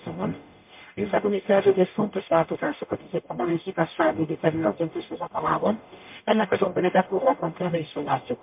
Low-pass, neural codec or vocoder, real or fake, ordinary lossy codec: 3.6 kHz; codec, 44.1 kHz, 0.9 kbps, DAC; fake; MP3, 32 kbps